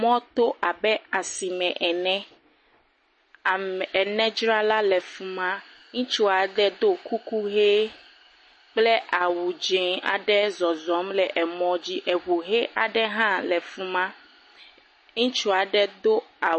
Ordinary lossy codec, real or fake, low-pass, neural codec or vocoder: MP3, 32 kbps; real; 10.8 kHz; none